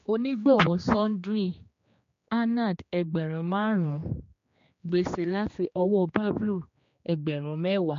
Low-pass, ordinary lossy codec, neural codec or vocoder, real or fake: 7.2 kHz; MP3, 48 kbps; codec, 16 kHz, 2 kbps, X-Codec, HuBERT features, trained on general audio; fake